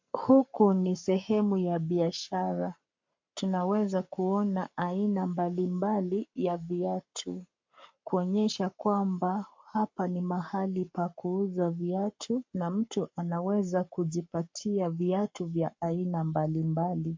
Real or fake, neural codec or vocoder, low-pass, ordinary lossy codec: fake; codec, 44.1 kHz, 7.8 kbps, Pupu-Codec; 7.2 kHz; MP3, 48 kbps